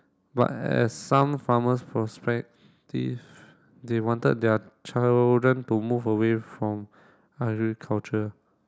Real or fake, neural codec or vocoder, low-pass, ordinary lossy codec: real; none; none; none